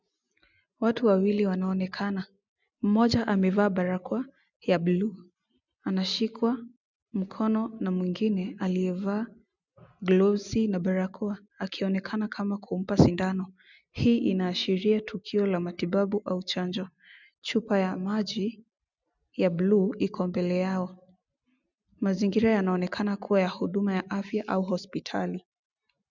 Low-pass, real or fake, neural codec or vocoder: 7.2 kHz; real; none